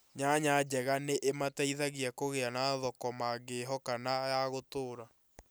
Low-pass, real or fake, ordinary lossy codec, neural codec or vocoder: none; real; none; none